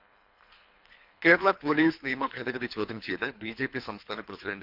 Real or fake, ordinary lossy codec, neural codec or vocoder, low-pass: fake; none; codec, 24 kHz, 3 kbps, HILCodec; 5.4 kHz